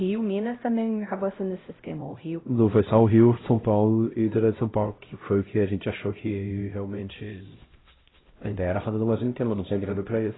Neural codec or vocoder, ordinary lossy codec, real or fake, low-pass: codec, 16 kHz, 0.5 kbps, X-Codec, HuBERT features, trained on LibriSpeech; AAC, 16 kbps; fake; 7.2 kHz